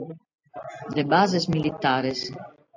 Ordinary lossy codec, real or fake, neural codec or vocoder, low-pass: AAC, 48 kbps; real; none; 7.2 kHz